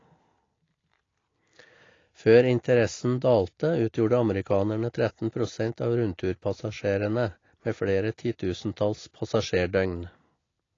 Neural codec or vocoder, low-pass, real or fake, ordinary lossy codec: none; 7.2 kHz; real; AAC, 32 kbps